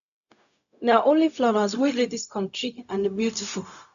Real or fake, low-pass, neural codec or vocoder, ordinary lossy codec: fake; 7.2 kHz; codec, 16 kHz, 0.4 kbps, LongCat-Audio-Codec; none